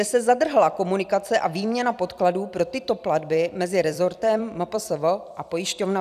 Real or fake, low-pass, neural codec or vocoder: fake; 14.4 kHz; vocoder, 44.1 kHz, 128 mel bands every 256 samples, BigVGAN v2